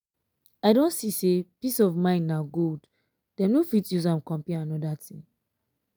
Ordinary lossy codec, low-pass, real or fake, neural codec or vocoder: none; none; real; none